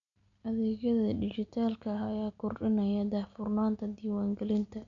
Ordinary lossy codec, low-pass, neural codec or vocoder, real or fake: none; 7.2 kHz; none; real